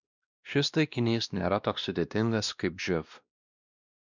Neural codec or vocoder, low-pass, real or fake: codec, 16 kHz, 1 kbps, X-Codec, WavLM features, trained on Multilingual LibriSpeech; 7.2 kHz; fake